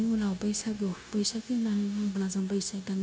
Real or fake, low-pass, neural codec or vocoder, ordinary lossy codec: fake; none; codec, 16 kHz, 0.9 kbps, LongCat-Audio-Codec; none